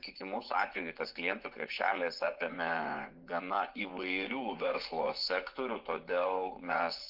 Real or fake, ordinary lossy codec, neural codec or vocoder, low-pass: fake; Opus, 32 kbps; vocoder, 24 kHz, 100 mel bands, Vocos; 5.4 kHz